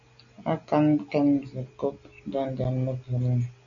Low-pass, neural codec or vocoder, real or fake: 7.2 kHz; none; real